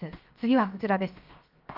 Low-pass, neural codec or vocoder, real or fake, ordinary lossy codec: 5.4 kHz; codec, 16 kHz, 0.7 kbps, FocalCodec; fake; Opus, 32 kbps